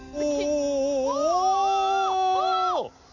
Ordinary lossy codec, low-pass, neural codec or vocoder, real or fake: none; 7.2 kHz; none; real